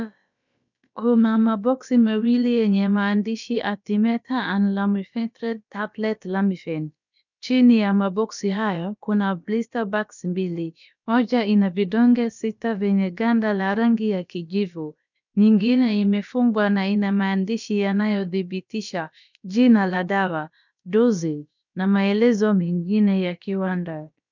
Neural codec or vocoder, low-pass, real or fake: codec, 16 kHz, about 1 kbps, DyCAST, with the encoder's durations; 7.2 kHz; fake